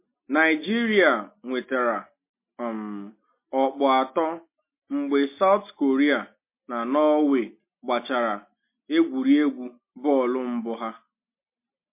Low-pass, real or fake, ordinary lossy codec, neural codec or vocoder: 3.6 kHz; real; MP3, 24 kbps; none